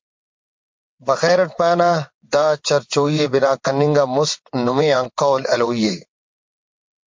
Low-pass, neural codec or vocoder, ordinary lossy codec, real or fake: 7.2 kHz; vocoder, 22.05 kHz, 80 mel bands, WaveNeXt; MP3, 48 kbps; fake